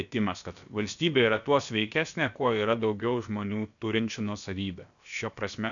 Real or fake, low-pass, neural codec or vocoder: fake; 7.2 kHz; codec, 16 kHz, about 1 kbps, DyCAST, with the encoder's durations